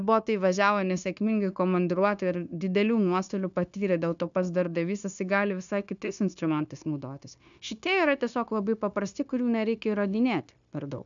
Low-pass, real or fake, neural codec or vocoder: 7.2 kHz; fake; codec, 16 kHz, 0.9 kbps, LongCat-Audio-Codec